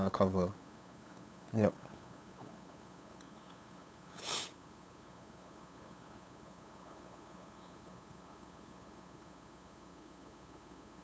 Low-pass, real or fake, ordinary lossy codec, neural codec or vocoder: none; fake; none; codec, 16 kHz, 8 kbps, FunCodec, trained on LibriTTS, 25 frames a second